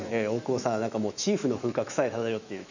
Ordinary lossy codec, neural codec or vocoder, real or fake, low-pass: none; autoencoder, 48 kHz, 128 numbers a frame, DAC-VAE, trained on Japanese speech; fake; 7.2 kHz